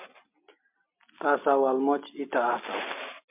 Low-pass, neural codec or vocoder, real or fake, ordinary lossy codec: 3.6 kHz; none; real; MP3, 32 kbps